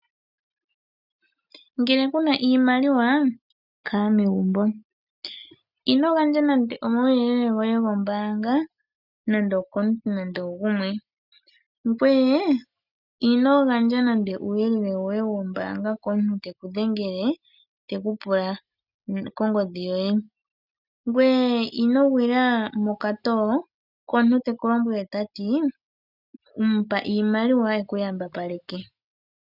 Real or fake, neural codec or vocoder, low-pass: real; none; 5.4 kHz